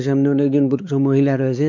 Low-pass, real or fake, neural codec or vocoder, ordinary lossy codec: 7.2 kHz; fake; codec, 16 kHz, 4 kbps, X-Codec, WavLM features, trained on Multilingual LibriSpeech; none